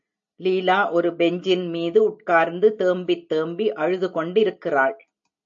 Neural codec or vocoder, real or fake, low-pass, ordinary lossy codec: none; real; 7.2 kHz; AAC, 64 kbps